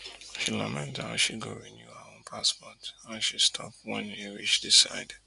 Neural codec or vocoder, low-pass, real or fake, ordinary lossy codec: none; 10.8 kHz; real; none